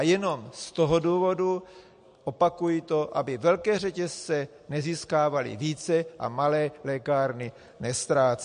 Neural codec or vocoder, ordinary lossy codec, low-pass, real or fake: none; MP3, 48 kbps; 9.9 kHz; real